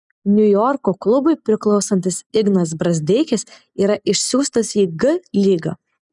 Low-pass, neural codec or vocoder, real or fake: 10.8 kHz; none; real